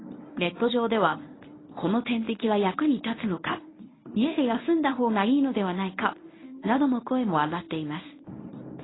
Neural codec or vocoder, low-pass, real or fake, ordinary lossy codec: codec, 24 kHz, 0.9 kbps, WavTokenizer, medium speech release version 1; 7.2 kHz; fake; AAC, 16 kbps